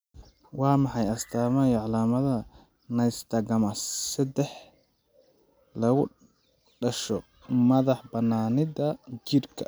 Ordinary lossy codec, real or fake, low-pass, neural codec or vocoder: none; real; none; none